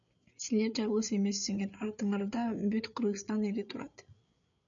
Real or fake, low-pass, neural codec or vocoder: fake; 7.2 kHz; codec, 16 kHz, 8 kbps, FreqCodec, larger model